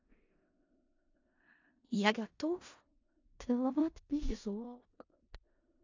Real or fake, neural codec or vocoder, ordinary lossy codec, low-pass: fake; codec, 16 kHz in and 24 kHz out, 0.4 kbps, LongCat-Audio-Codec, four codebook decoder; AAC, 48 kbps; 7.2 kHz